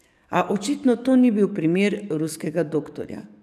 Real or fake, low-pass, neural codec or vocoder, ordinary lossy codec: fake; 14.4 kHz; codec, 44.1 kHz, 7.8 kbps, DAC; none